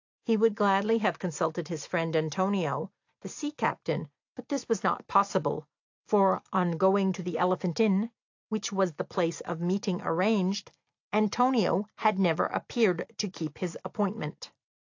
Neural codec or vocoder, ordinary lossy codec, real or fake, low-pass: none; AAC, 48 kbps; real; 7.2 kHz